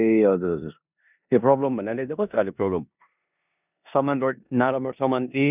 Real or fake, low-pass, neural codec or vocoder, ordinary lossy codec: fake; 3.6 kHz; codec, 16 kHz in and 24 kHz out, 0.9 kbps, LongCat-Audio-Codec, four codebook decoder; none